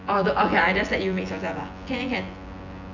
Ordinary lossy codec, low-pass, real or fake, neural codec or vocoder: none; 7.2 kHz; fake; vocoder, 24 kHz, 100 mel bands, Vocos